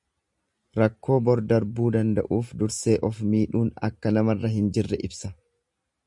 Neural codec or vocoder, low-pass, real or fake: none; 10.8 kHz; real